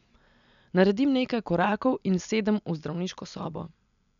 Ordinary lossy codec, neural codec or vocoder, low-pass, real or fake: none; none; 7.2 kHz; real